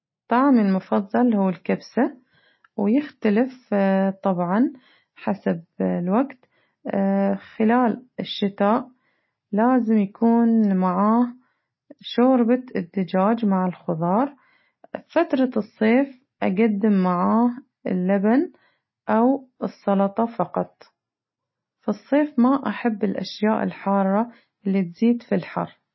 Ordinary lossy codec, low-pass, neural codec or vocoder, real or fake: MP3, 24 kbps; 7.2 kHz; none; real